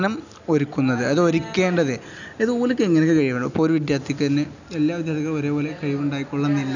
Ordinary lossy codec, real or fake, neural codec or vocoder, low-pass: none; real; none; 7.2 kHz